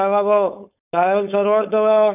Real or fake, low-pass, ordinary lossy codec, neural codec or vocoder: fake; 3.6 kHz; none; codec, 16 kHz, 4.8 kbps, FACodec